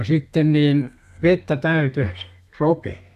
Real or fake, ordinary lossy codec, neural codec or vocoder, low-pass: fake; none; codec, 32 kHz, 1.9 kbps, SNAC; 14.4 kHz